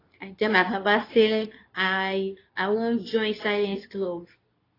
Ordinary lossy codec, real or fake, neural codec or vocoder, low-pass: AAC, 24 kbps; fake; codec, 24 kHz, 0.9 kbps, WavTokenizer, medium speech release version 2; 5.4 kHz